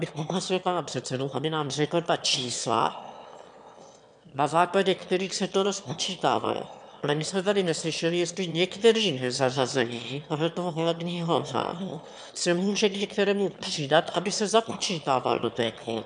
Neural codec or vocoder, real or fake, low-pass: autoencoder, 22.05 kHz, a latent of 192 numbers a frame, VITS, trained on one speaker; fake; 9.9 kHz